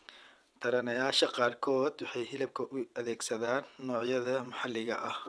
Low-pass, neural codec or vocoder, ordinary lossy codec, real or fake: none; vocoder, 22.05 kHz, 80 mel bands, WaveNeXt; none; fake